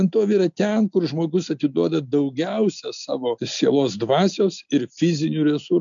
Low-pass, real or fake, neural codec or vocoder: 7.2 kHz; real; none